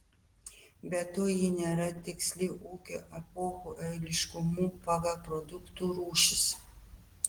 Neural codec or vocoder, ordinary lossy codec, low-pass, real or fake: none; Opus, 16 kbps; 19.8 kHz; real